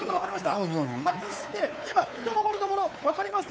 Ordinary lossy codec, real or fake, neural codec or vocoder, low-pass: none; fake; codec, 16 kHz, 4 kbps, X-Codec, WavLM features, trained on Multilingual LibriSpeech; none